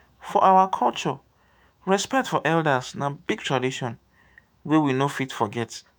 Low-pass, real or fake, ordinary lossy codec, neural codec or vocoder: none; fake; none; autoencoder, 48 kHz, 128 numbers a frame, DAC-VAE, trained on Japanese speech